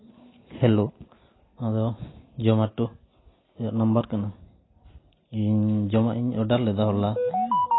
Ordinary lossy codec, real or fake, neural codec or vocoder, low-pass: AAC, 16 kbps; real; none; 7.2 kHz